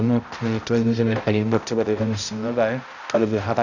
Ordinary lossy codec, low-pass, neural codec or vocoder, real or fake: none; 7.2 kHz; codec, 16 kHz, 0.5 kbps, X-Codec, HuBERT features, trained on balanced general audio; fake